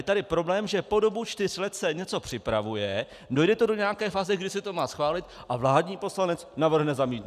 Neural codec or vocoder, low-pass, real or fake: none; 14.4 kHz; real